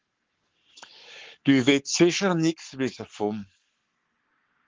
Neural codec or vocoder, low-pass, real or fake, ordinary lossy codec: codec, 44.1 kHz, 7.8 kbps, Pupu-Codec; 7.2 kHz; fake; Opus, 16 kbps